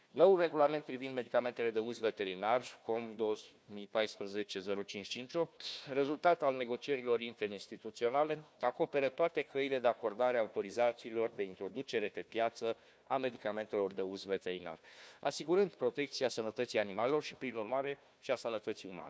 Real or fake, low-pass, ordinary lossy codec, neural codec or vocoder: fake; none; none; codec, 16 kHz, 1 kbps, FunCodec, trained on Chinese and English, 50 frames a second